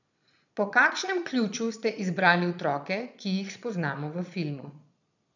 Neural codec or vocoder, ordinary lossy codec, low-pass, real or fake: vocoder, 22.05 kHz, 80 mel bands, Vocos; none; 7.2 kHz; fake